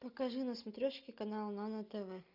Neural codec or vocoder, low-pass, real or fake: none; 5.4 kHz; real